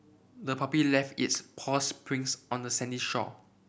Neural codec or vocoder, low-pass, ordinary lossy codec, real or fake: none; none; none; real